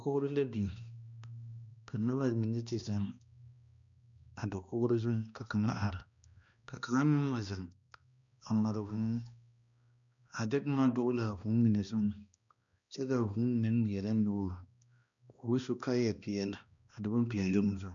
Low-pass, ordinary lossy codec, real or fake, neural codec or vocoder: 7.2 kHz; MP3, 96 kbps; fake; codec, 16 kHz, 1 kbps, X-Codec, HuBERT features, trained on balanced general audio